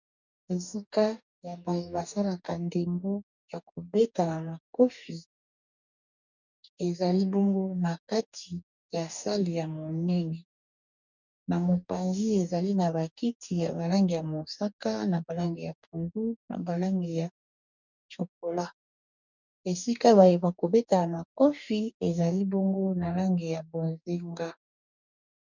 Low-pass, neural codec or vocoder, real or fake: 7.2 kHz; codec, 44.1 kHz, 2.6 kbps, DAC; fake